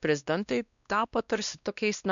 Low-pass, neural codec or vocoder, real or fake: 7.2 kHz; codec, 16 kHz, 1 kbps, X-Codec, WavLM features, trained on Multilingual LibriSpeech; fake